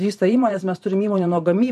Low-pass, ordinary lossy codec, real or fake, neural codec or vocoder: 14.4 kHz; MP3, 64 kbps; fake; vocoder, 44.1 kHz, 128 mel bands every 512 samples, BigVGAN v2